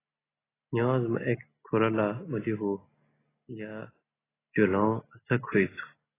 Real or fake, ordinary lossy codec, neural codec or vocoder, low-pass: real; AAC, 16 kbps; none; 3.6 kHz